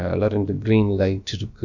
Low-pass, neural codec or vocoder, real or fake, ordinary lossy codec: 7.2 kHz; codec, 16 kHz, about 1 kbps, DyCAST, with the encoder's durations; fake; none